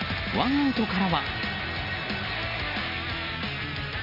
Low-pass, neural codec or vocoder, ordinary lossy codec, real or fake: 5.4 kHz; none; none; real